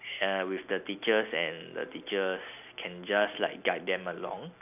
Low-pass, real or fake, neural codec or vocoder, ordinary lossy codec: 3.6 kHz; real; none; none